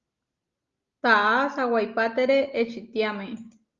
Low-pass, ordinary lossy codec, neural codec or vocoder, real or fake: 7.2 kHz; Opus, 32 kbps; none; real